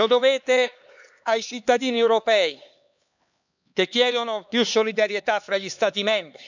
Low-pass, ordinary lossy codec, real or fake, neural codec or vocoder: 7.2 kHz; none; fake; codec, 16 kHz, 4 kbps, X-Codec, HuBERT features, trained on LibriSpeech